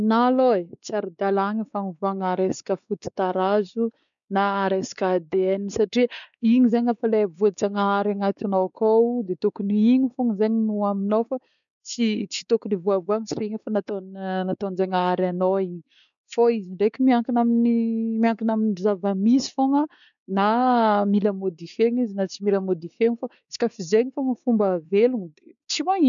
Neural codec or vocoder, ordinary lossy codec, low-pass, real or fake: codec, 16 kHz, 4 kbps, X-Codec, WavLM features, trained on Multilingual LibriSpeech; none; 7.2 kHz; fake